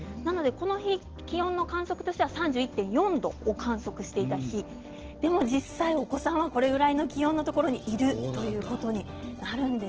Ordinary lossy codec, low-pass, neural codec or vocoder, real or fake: Opus, 16 kbps; 7.2 kHz; none; real